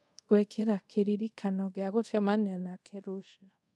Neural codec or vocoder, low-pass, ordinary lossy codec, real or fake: codec, 24 kHz, 0.5 kbps, DualCodec; none; none; fake